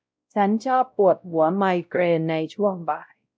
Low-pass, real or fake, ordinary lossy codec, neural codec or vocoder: none; fake; none; codec, 16 kHz, 0.5 kbps, X-Codec, WavLM features, trained on Multilingual LibriSpeech